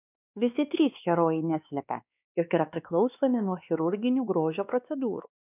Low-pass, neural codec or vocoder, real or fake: 3.6 kHz; codec, 16 kHz, 2 kbps, X-Codec, WavLM features, trained on Multilingual LibriSpeech; fake